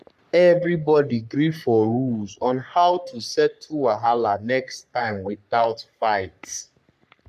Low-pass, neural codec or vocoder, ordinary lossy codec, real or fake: 14.4 kHz; codec, 44.1 kHz, 3.4 kbps, Pupu-Codec; MP3, 96 kbps; fake